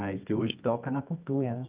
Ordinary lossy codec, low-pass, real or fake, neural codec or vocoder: Opus, 24 kbps; 3.6 kHz; fake; codec, 24 kHz, 0.9 kbps, WavTokenizer, medium music audio release